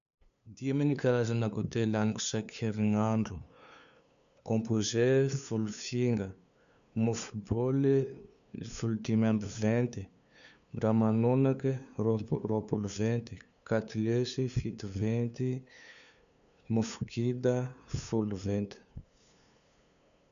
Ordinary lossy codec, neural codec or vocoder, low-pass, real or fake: none; codec, 16 kHz, 2 kbps, FunCodec, trained on LibriTTS, 25 frames a second; 7.2 kHz; fake